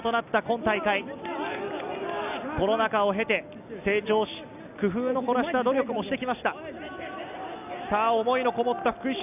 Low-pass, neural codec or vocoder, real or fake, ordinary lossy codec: 3.6 kHz; none; real; none